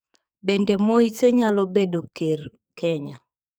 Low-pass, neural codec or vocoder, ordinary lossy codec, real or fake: none; codec, 44.1 kHz, 2.6 kbps, SNAC; none; fake